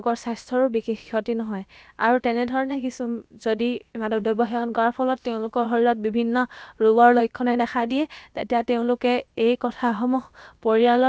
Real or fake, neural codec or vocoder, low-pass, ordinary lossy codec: fake; codec, 16 kHz, about 1 kbps, DyCAST, with the encoder's durations; none; none